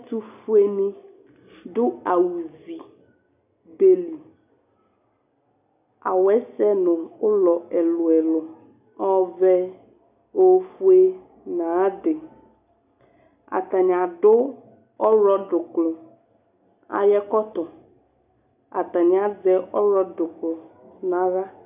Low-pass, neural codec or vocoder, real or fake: 3.6 kHz; none; real